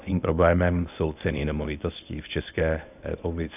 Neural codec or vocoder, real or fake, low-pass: codec, 24 kHz, 0.9 kbps, WavTokenizer, medium speech release version 1; fake; 3.6 kHz